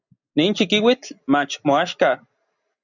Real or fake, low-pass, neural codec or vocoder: real; 7.2 kHz; none